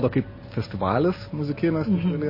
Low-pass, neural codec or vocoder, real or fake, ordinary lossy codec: 5.4 kHz; none; real; MP3, 24 kbps